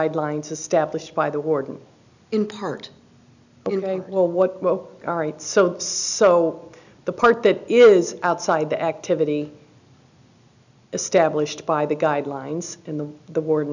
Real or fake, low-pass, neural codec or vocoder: real; 7.2 kHz; none